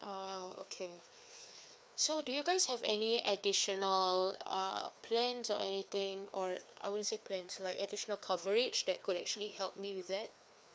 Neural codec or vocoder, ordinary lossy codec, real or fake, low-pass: codec, 16 kHz, 2 kbps, FreqCodec, larger model; none; fake; none